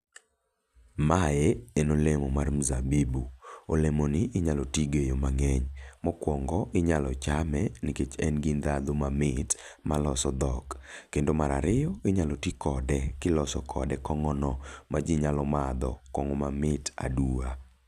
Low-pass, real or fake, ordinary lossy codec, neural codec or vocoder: 14.4 kHz; real; none; none